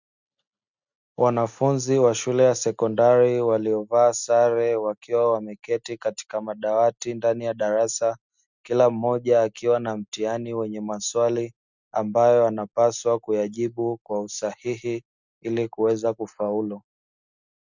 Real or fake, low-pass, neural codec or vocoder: real; 7.2 kHz; none